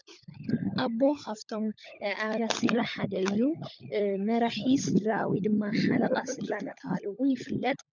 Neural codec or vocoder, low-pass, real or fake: codec, 16 kHz, 16 kbps, FunCodec, trained on LibriTTS, 50 frames a second; 7.2 kHz; fake